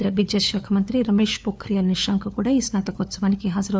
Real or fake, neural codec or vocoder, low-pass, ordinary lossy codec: fake; codec, 16 kHz, 4 kbps, FunCodec, trained on LibriTTS, 50 frames a second; none; none